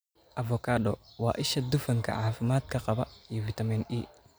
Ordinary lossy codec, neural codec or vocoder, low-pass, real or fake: none; none; none; real